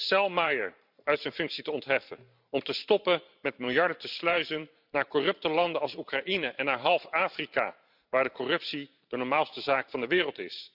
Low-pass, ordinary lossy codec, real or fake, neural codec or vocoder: 5.4 kHz; none; fake; vocoder, 44.1 kHz, 128 mel bands every 512 samples, BigVGAN v2